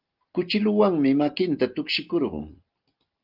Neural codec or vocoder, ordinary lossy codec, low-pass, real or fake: none; Opus, 32 kbps; 5.4 kHz; real